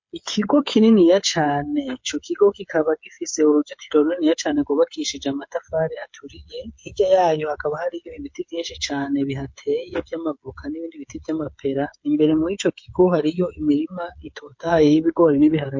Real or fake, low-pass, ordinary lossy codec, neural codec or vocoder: fake; 7.2 kHz; MP3, 48 kbps; codec, 16 kHz, 8 kbps, FreqCodec, smaller model